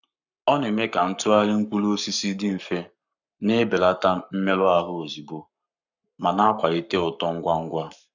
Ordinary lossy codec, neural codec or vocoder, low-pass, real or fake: none; codec, 44.1 kHz, 7.8 kbps, Pupu-Codec; 7.2 kHz; fake